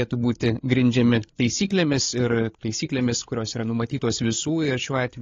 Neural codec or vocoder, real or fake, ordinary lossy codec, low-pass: codec, 16 kHz, 8 kbps, FreqCodec, larger model; fake; AAC, 32 kbps; 7.2 kHz